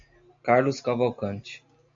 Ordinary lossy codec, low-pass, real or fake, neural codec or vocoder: AAC, 64 kbps; 7.2 kHz; real; none